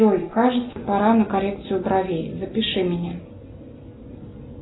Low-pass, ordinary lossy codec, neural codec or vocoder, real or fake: 7.2 kHz; AAC, 16 kbps; none; real